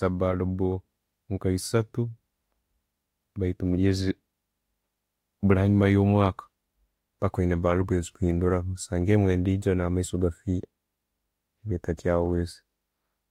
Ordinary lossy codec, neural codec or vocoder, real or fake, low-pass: AAC, 48 kbps; autoencoder, 48 kHz, 32 numbers a frame, DAC-VAE, trained on Japanese speech; fake; 19.8 kHz